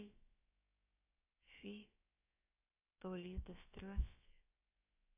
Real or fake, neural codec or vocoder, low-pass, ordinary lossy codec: fake; codec, 16 kHz, about 1 kbps, DyCAST, with the encoder's durations; 3.6 kHz; AAC, 32 kbps